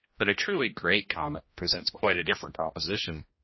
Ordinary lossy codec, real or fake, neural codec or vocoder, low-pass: MP3, 24 kbps; fake; codec, 16 kHz, 1 kbps, X-Codec, HuBERT features, trained on general audio; 7.2 kHz